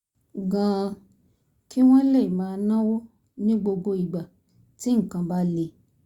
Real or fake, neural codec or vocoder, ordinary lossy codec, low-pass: real; none; Opus, 64 kbps; 19.8 kHz